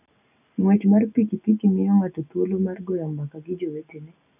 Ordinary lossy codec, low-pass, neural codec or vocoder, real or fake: none; 3.6 kHz; none; real